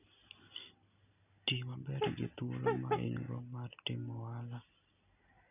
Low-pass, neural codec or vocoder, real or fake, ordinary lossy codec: 3.6 kHz; none; real; none